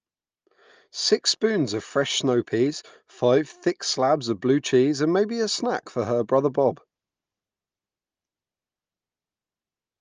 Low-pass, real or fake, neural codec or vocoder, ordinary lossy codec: 7.2 kHz; real; none; Opus, 24 kbps